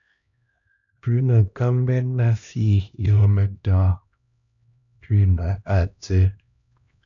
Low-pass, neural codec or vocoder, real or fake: 7.2 kHz; codec, 16 kHz, 1 kbps, X-Codec, HuBERT features, trained on LibriSpeech; fake